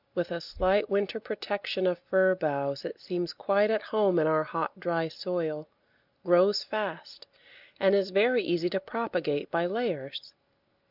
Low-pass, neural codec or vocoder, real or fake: 5.4 kHz; none; real